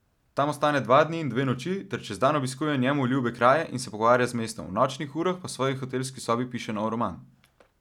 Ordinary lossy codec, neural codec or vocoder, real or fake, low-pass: none; none; real; 19.8 kHz